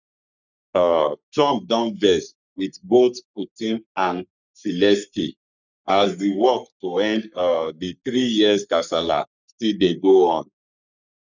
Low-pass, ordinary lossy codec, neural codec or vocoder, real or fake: 7.2 kHz; none; codec, 44.1 kHz, 3.4 kbps, Pupu-Codec; fake